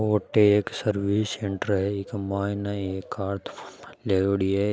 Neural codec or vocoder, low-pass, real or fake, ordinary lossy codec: none; none; real; none